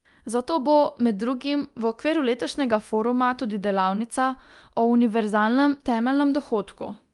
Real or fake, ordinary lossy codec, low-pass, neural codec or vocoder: fake; Opus, 32 kbps; 10.8 kHz; codec, 24 kHz, 0.9 kbps, DualCodec